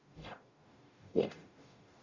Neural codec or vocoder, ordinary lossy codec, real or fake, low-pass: codec, 44.1 kHz, 0.9 kbps, DAC; Opus, 64 kbps; fake; 7.2 kHz